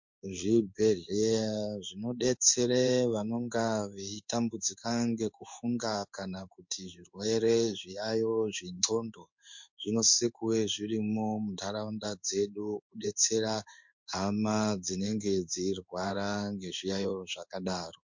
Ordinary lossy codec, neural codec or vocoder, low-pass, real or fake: MP3, 48 kbps; codec, 16 kHz in and 24 kHz out, 1 kbps, XY-Tokenizer; 7.2 kHz; fake